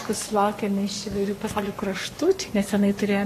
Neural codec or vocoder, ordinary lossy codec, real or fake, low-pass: codec, 44.1 kHz, 7.8 kbps, Pupu-Codec; AAC, 48 kbps; fake; 14.4 kHz